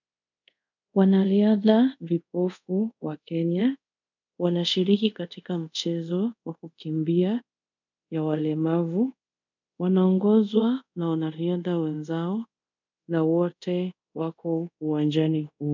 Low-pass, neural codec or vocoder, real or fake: 7.2 kHz; codec, 24 kHz, 0.5 kbps, DualCodec; fake